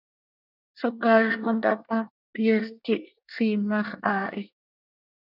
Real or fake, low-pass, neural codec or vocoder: fake; 5.4 kHz; codec, 24 kHz, 1 kbps, SNAC